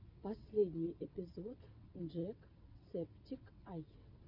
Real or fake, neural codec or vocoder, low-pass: fake; vocoder, 22.05 kHz, 80 mel bands, WaveNeXt; 5.4 kHz